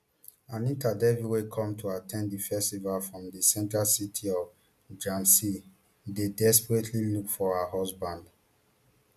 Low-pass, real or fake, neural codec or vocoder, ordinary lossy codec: 14.4 kHz; real; none; none